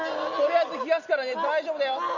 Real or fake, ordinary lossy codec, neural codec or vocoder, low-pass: real; none; none; 7.2 kHz